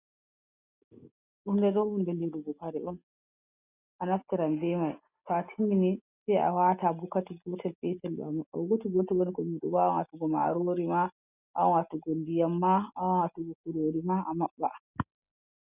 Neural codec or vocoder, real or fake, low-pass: none; real; 3.6 kHz